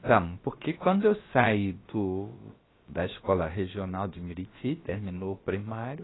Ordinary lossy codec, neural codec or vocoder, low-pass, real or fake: AAC, 16 kbps; codec, 16 kHz, about 1 kbps, DyCAST, with the encoder's durations; 7.2 kHz; fake